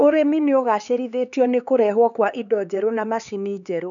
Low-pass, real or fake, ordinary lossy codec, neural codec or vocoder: 7.2 kHz; fake; none; codec, 16 kHz, 4 kbps, X-Codec, HuBERT features, trained on LibriSpeech